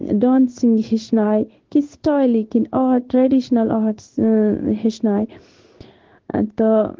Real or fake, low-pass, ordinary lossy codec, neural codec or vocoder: fake; 7.2 kHz; Opus, 16 kbps; codec, 16 kHz in and 24 kHz out, 1 kbps, XY-Tokenizer